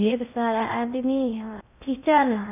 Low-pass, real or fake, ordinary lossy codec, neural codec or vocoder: 3.6 kHz; fake; none; codec, 16 kHz in and 24 kHz out, 0.6 kbps, FocalCodec, streaming, 2048 codes